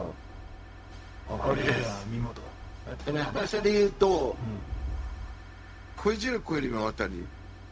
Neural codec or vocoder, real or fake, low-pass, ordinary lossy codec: codec, 16 kHz, 0.4 kbps, LongCat-Audio-Codec; fake; none; none